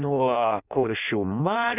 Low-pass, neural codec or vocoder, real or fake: 3.6 kHz; codec, 16 kHz in and 24 kHz out, 0.6 kbps, FireRedTTS-2 codec; fake